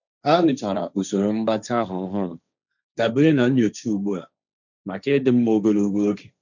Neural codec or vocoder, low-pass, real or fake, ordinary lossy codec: codec, 16 kHz, 1.1 kbps, Voila-Tokenizer; 7.2 kHz; fake; none